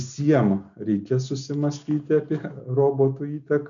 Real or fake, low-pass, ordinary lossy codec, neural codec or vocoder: real; 7.2 kHz; MP3, 96 kbps; none